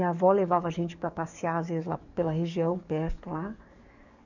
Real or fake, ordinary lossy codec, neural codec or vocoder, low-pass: fake; none; codec, 44.1 kHz, 7.8 kbps, DAC; 7.2 kHz